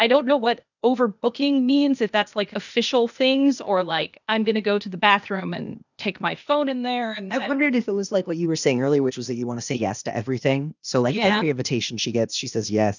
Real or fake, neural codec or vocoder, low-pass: fake; codec, 16 kHz, 0.8 kbps, ZipCodec; 7.2 kHz